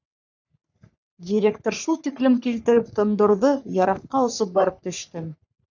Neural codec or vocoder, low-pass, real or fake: codec, 44.1 kHz, 3.4 kbps, Pupu-Codec; 7.2 kHz; fake